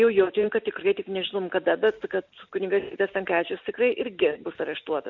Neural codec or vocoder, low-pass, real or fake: none; 7.2 kHz; real